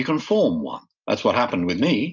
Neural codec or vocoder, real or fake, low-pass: none; real; 7.2 kHz